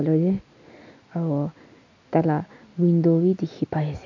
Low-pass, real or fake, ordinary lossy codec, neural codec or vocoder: 7.2 kHz; real; MP3, 64 kbps; none